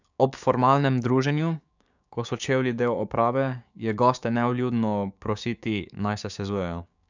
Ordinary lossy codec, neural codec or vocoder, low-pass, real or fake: none; codec, 16 kHz, 6 kbps, DAC; 7.2 kHz; fake